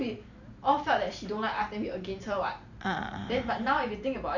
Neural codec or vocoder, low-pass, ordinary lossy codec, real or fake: vocoder, 44.1 kHz, 128 mel bands every 512 samples, BigVGAN v2; 7.2 kHz; none; fake